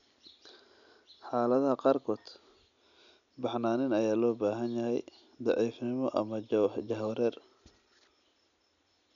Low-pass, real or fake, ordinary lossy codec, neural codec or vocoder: 7.2 kHz; real; none; none